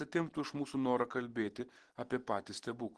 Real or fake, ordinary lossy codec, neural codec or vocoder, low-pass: real; Opus, 16 kbps; none; 10.8 kHz